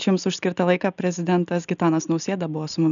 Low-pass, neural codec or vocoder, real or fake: 7.2 kHz; none; real